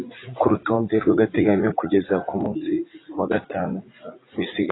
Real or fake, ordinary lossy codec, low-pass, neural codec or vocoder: fake; AAC, 16 kbps; 7.2 kHz; vocoder, 44.1 kHz, 80 mel bands, Vocos